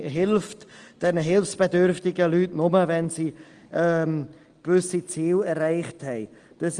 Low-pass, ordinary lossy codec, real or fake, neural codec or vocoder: 9.9 kHz; Opus, 24 kbps; real; none